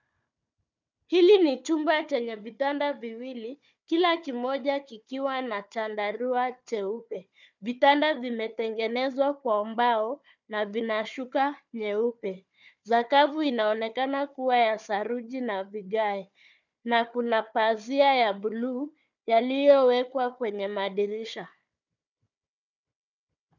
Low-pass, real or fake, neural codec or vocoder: 7.2 kHz; fake; codec, 16 kHz, 4 kbps, FunCodec, trained on Chinese and English, 50 frames a second